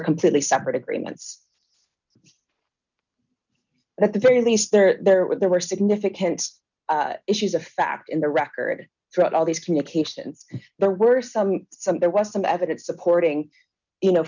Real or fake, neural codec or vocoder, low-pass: real; none; 7.2 kHz